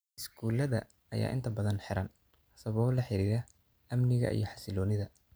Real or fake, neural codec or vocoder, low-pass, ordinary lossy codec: real; none; none; none